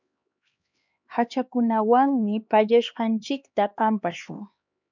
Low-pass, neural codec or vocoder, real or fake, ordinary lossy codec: 7.2 kHz; codec, 16 kHz, 2 kbps, X-Codec, HuBERT features, trained on LibriSpeech; fake; MP3, 64 kbps